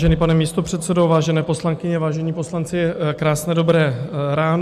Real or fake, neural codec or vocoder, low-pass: real; none; 14.4 kHz